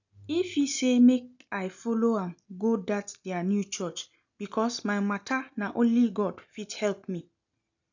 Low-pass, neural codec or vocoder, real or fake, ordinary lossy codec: 7.2 kHz; none; real; none